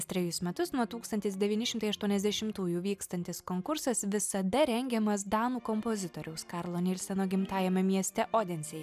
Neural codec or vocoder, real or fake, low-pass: none; real; 14.4 kHz